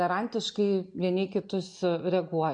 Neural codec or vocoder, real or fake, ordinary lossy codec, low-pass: none; real; MP3, 64 kbps; 9.9 kHz